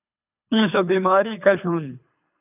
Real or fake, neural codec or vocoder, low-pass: fake; codec, 24 kHz, 3 kbps, HILCodec; 3.6 kHz